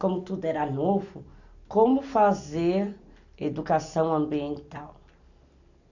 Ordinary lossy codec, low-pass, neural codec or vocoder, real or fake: none; 7.2 kHz; none; real